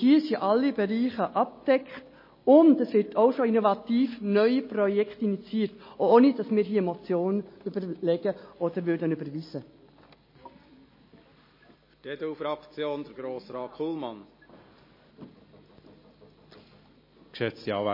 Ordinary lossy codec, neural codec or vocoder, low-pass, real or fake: MP3, 24 kbps; none; 5.4 kHz; real